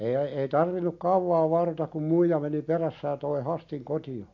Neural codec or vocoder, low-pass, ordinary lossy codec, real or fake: none; 7.2 kHz; AAC, 48 kbps; real